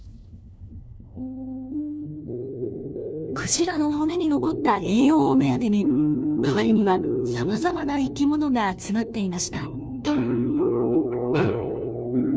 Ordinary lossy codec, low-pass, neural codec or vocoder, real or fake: none; none; codec, 16 kHz, 1 kbps, FunCodec, trained on LibriTTS, 50 frames a second; fake